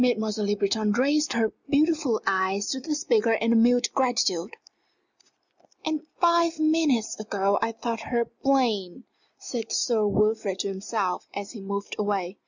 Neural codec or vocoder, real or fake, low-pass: none; real; 7.2 kHz